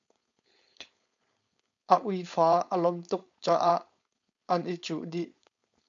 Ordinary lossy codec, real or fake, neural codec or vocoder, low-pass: MP3, 64 kbps; fake; codec, 16 kHz, 4.8 kbps, FACodec; 7.2 kHz